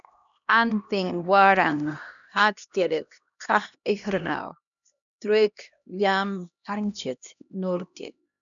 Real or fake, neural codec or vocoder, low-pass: fake; codec, 16 kHz, 1 kbps, X-Codec, HuBERT features, trained on LibriSpeech; 7.2 kHz